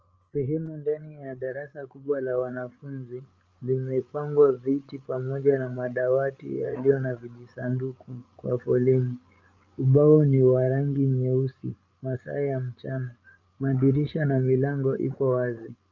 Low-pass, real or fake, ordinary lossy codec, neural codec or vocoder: 7.2 kHz; fake; Opus, 24 kbps; codec, 16 kHz, 8 kbps, FreqCodec, larger model